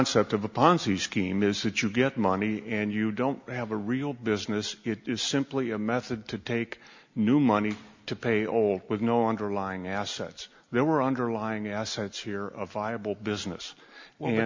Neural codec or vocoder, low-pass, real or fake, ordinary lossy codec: none; 7.2 kHz; real; MP3, 64 kbps